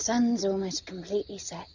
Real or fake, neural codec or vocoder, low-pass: real; none; 7.2 kHz